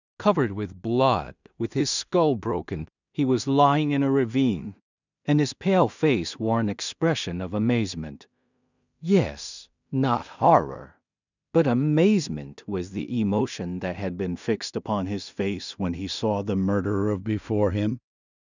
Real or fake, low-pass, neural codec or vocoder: fake; 7.2 kHz; codec, 16 kHz in and 24 kHz out, 0.4 kbps, LongCat-Audio-Codec, two codebook decoder